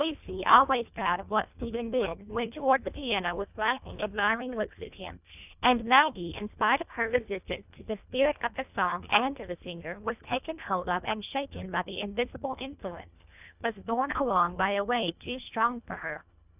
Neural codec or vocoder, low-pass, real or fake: codec, 24 kHz, 1.5 kbps, HILCodec; 3.6 kHz; fake